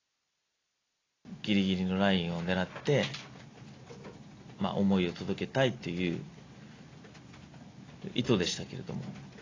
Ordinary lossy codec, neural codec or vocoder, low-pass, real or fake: AAC, 32 kbps; none; 7.2 kHz; real